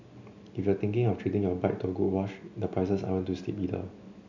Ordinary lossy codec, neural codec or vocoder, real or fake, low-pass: none; none; real; 7.2 kHz